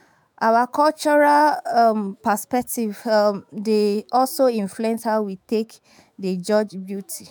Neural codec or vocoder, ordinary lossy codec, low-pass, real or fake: autoencoder, 48 kHz, 128 numbers a frame, DAC-VAE, trained on Japanese speech; none; none; fake